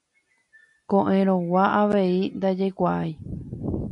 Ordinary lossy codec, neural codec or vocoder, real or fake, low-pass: MP3, 48 kbps; none; real; 10.8 kHz